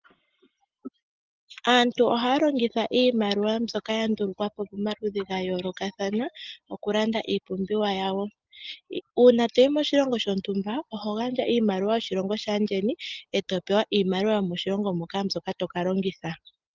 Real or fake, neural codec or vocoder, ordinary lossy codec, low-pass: real; none; Opus, 32 kbps; 7.2 kHz